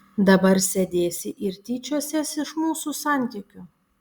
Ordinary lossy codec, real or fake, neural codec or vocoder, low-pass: Opus, 64 kbps; real; none; 19.8 kHz